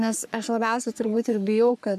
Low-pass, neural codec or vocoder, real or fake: 14.4 kHz; codec, 44.1 kHz, 3.4 kbps, Pupu-Codec; fake